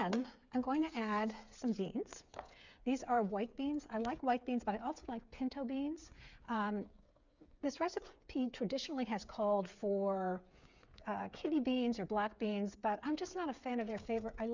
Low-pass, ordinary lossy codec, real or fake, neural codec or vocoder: 7.2 kHz; Opus, 64 kbps; fake; codec, 16 kHz, 8 kbps, FreqCodec, smaller model